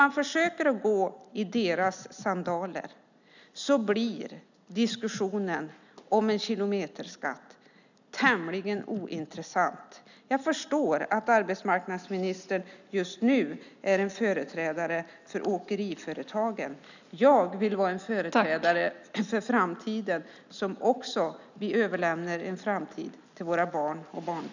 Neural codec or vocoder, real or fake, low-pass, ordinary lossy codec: none; real; 7.2 kHz; none